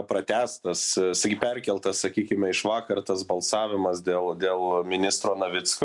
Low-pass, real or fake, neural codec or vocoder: 10.8 kHz; real; none